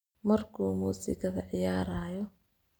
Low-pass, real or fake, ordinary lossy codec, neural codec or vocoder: none; real; none; none